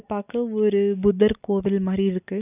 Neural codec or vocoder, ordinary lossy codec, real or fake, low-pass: none; none; real; 3.6 kHz